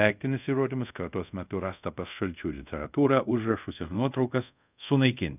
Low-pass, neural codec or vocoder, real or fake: 3.6 kHz; codec, 24 kHz, 0.5 kbps, DualCodec; fake